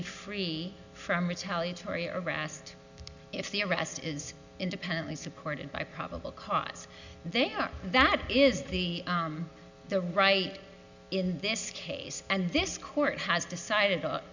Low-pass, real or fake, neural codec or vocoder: 7.2 kHz; real; none